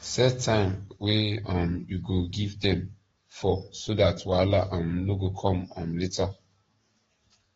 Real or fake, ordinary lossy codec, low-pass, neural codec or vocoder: fake; AAC, 24 kbps; 19.8 kHz; codec, 44.1 kHz, 7.8 kbps, DAC